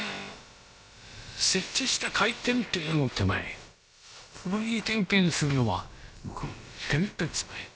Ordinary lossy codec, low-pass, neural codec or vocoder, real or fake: none; none; codec, 16 kHz, about 1 kbps, DyCAST, with the encoder's durations; fake